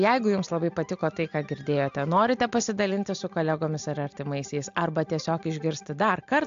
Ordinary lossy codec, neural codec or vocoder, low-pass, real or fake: AAC, 64 kbps; none; 7.2 kHz; real